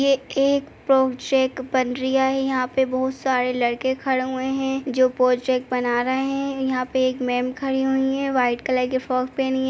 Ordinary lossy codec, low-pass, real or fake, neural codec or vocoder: none; none; real; none